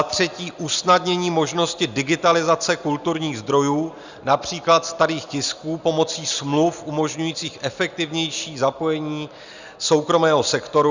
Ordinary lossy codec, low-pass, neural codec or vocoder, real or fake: Opus, 64 kbps; 7.2 kHz; none; real